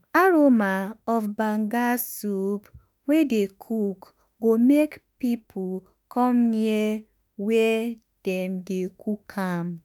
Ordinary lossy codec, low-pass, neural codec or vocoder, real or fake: none; none; autoencoder, 48 kHz, 32 numbers a frame, DAC-VAE, trained on Japanese speech; fake